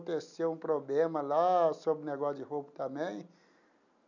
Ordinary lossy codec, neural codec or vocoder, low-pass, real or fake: none; none; 7.2 kHz; real